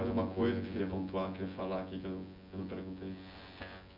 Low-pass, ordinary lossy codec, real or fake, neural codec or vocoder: 5.4 kHz; MP3, 48 kbps; fake; vocoder, 24 kHz, 100 mel bands, Vocos